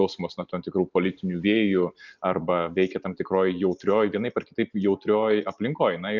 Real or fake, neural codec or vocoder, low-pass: real; none; 7.2 kHz